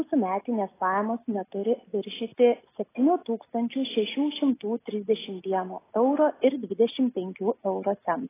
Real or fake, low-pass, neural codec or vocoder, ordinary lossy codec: real; 3.6 kHz; none; AAC, 16 kbps